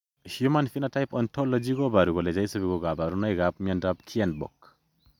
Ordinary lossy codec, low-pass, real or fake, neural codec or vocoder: none; 19.8 kHz; real; none